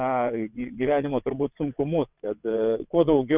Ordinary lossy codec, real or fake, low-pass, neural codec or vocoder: Opus, 64 kbps; fake; 3.6 kHz; vocoder, 22.05 kHz, 80 mel bands, WaveNeXt